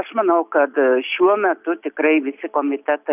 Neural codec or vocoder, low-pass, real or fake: none; 3.6 kHz; real